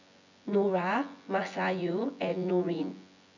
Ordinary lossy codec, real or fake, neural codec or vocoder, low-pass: none; fake; vocoder, 24 kHz, 100 mel bands, Vocos; 7.2 kHz